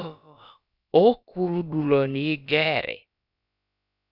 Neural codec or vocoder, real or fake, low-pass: codec, 16 kHz, about 1 kbps, DyCAST, with the encoder's durations; fake; 5.4 kHz